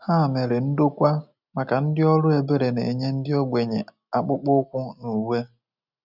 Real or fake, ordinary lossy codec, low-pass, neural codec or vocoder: real; none; 5.4 kHz; none